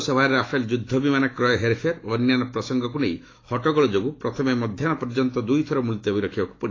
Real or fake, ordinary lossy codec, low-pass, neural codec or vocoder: fake; AAC, 32 kbps; 7.2 kHz; autoencoder, 48 kHz, 128 numbers a frame, DAC-VAE, trained on Japanese speech